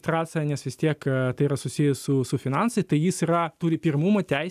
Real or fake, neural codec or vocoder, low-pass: real; none; 14.4 kHz